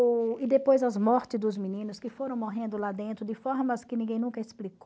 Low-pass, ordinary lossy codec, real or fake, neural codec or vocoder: none; none; real; none